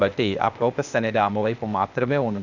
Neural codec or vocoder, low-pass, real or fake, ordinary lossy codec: codec, 16 kHz, 0.8 kbps, ZipCodec; 7.2 kHz; fake; none